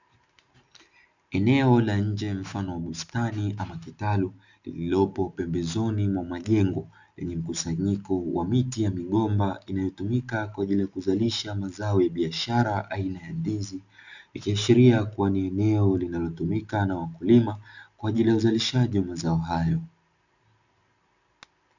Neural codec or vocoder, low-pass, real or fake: none; 7.2 kHz; real